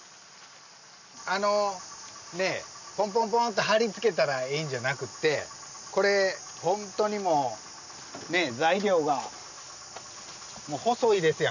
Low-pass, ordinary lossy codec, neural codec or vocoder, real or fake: 7.2 kHz; none; none; real